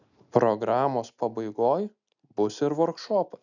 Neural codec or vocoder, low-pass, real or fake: none; 7.2 kHz; real